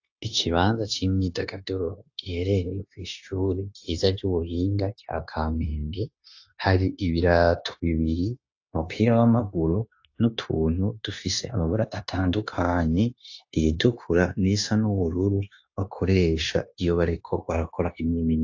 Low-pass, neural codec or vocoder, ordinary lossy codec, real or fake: 7.2 kHz; codec, 16 kHz, 0.9 kbps, LongCat-Audio-Codec; AAC, 48 kbps; fake